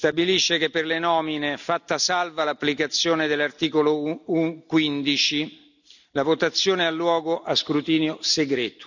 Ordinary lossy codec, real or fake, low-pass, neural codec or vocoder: none; real; 7.2 kHz; none